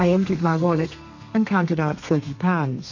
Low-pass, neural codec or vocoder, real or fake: 7.2 kHz; codec, 32 kHz, 1.9 kbps, SNAC; fake